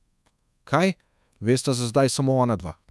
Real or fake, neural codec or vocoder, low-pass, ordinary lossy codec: fake; codec, 24 kHz, 1.2 kbps, DualCodec; none; none